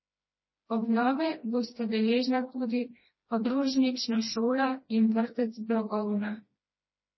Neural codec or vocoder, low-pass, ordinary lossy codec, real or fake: codec, 16 kHz, 1 kbps, FreqCodec, smaller model; 7.2 kHz; MP3, 24 kbps; fake